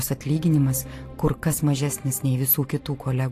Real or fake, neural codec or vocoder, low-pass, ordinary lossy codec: real; none; 14.4 kHz; AAC, 64 kbps